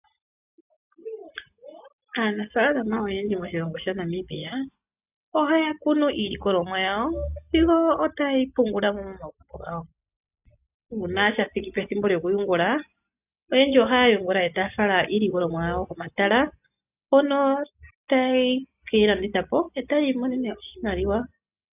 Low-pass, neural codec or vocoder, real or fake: 3.6 kHz; none; real